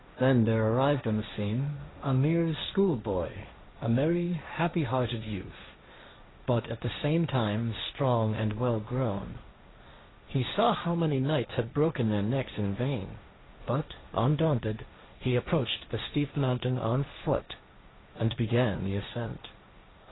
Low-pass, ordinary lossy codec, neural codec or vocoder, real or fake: 7.2 kHz; AAC, 16 kbps; codec, 16 kHz, 1.1 kbps, Voila-Tokenizer; fake